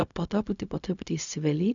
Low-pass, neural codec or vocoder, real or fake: 7.2 kHz; codec, 16 kHz, 0.4 kbps, LongCat-Audio-Codec; fake